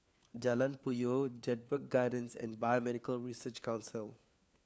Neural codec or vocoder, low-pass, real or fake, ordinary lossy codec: codec, 16 kHz, 4 kbps, FunCodec, trained on LibriTTS, 50 frames a second; none; fake; none